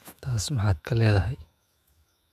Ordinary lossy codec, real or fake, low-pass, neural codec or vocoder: none; fake; 14.4 kHz; autoencoder, 48 kHz, 128 numbers a frame, DAC-VAE, trained on Japanese speech